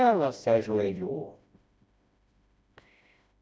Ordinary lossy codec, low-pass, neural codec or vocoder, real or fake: none; none; codec, 16 kHz, 1 kbps, FreqCodec, smaller model; fake